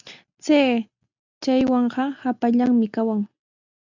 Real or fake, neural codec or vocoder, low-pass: real; none; 7.2 kHz